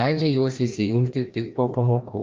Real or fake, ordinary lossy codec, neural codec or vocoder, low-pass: fake; Opus, 24 kbps; codec, 16 kHz, 1 kbps, FreqCodec, larger model; 7.2 kHz